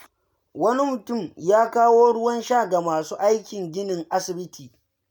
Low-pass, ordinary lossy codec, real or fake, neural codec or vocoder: none; none; real; none